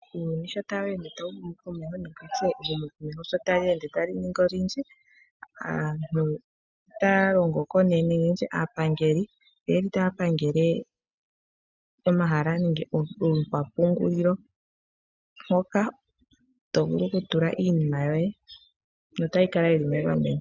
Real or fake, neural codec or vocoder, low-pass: real; none; 7.2 kHz